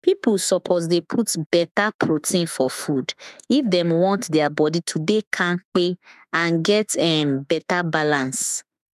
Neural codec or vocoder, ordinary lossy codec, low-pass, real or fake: autoencoder, 48 kHz, 32 numbers a frame, DAC-VAE, trained on Japanese speech; none; 14.4 kHz; fake